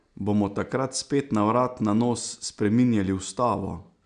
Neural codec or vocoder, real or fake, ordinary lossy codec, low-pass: none; real; none; 9.9 kHz